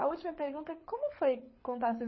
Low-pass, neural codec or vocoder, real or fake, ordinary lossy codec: 7.2 kHz; codec, 24 kHz, 6 kbps, HILCodec; fake; MP3, 24 kbps